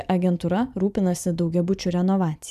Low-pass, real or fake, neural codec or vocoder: 14.4 kHz; fake; vocoder, 44.1 kHz, 128 mel bands every 512 samples, BigVGAN v2